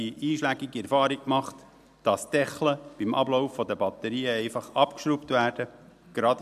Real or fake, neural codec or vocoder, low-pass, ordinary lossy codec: real; none; 14.4 kHz; AAC, 96 kbps